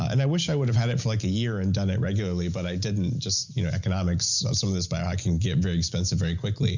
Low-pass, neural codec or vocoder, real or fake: 7.2 kHz; none; real